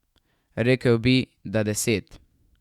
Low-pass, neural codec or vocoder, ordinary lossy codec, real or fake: 19.8 kHz; autoencoder, 48 kHz, 128 numbers a frame, DAC-VAE, trained on Japanese speech; Opus, 64 kbps; fake